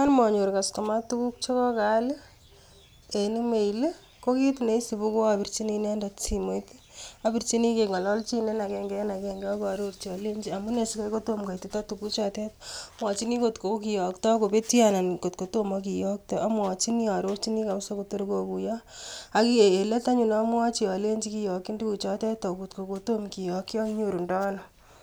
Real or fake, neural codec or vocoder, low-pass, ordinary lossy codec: real; none; none; none